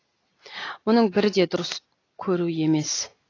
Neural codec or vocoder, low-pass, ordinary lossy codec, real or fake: none; 7.2 kHz; AAC, 32 kbps; real